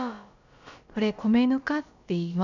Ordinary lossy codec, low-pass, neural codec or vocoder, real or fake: none; 7.2 kHz; codec, 16 kHz, about 1 kbps, DyCAST, with the encoder's durations; fake